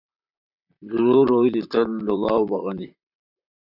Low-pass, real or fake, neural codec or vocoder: 5.4 kHz; fake; vocoder, 44.1 kHz, 128 mel bands, Pupu-Vocoder